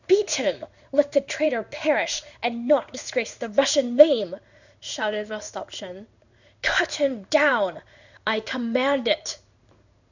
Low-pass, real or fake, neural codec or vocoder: 7.2 kHz; fake; codec, 16 kHz in and 24 kHz out, 1 kbps, XY-Tokenizer